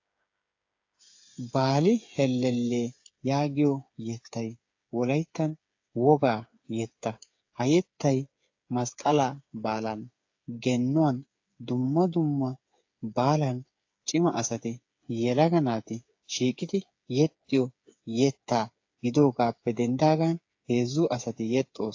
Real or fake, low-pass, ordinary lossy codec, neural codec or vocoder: fake; 7.2 kHz; AAC, 48 kbps; codec, 16 kHz, 8 kbps, FreqCodec, smaller model